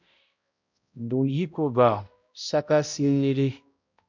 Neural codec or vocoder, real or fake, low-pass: codec, 16 kHz, 0.5 kbps, X-Codec, HuBERT features, trained on balanced general audio; fake; 7.2 kHz